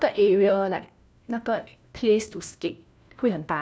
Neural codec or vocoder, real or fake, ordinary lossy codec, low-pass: codec, 16 kHz, 1 kbps, FunCodec, trained on LibriTTS, 50 frames a second; fake; none; none